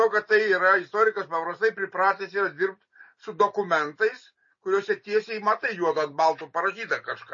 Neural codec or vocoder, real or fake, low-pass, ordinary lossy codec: none; real; 7.2 kHz; MP3, 32 kbps